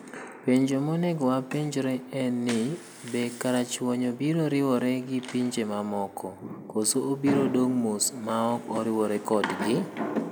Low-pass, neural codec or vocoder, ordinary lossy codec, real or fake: none; none; none; real